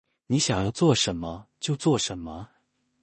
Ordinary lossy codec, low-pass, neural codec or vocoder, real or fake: MP3, 32 kbps; 10.8 kHz; codec, 16 kHz in and 24 kHz out, 0.4 kbps, LongCat-Audio-Codec, two codebook decoder; fake